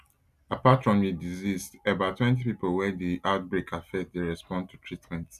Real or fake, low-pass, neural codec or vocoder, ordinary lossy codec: real; 14.4 kHz; none; none